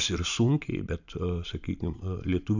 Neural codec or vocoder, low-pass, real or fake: vocoder, 44.1 kHz, 128 mel bands every 512 samples, BigVGAN v2; 7.2 kHz; fake